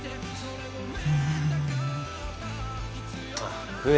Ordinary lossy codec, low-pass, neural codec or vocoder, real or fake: none; none; none; real